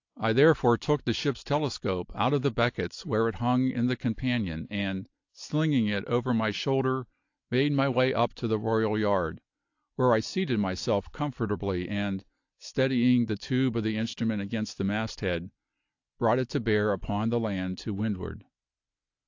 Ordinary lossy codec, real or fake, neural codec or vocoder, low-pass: AAC, 48 kbps; real; none; 7.2 kHz